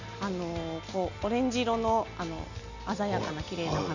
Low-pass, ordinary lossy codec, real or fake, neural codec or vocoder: 7.2 kHz; none; real; none